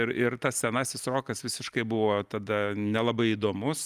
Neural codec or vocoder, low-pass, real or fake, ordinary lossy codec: none; 14.4 kHz; real; Opus, 32 kbps